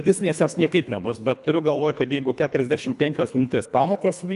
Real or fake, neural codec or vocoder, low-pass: fake; codec, 24 kHz, 1.5 kbps, HILCodec; 10.8 kHz